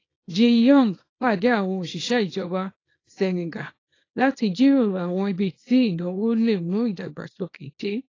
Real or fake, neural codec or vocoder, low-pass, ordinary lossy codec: fake; codec, 24 kHz, 0.9 kbps, WavTokenizer, small release; 7.2 kHz; AAC, 32 kbps